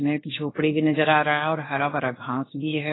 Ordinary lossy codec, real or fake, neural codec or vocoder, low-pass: AAC, 16 kbps; fake; codec, 16 kHz, 0.7 kbps, FocalCodec; 7.2 kHz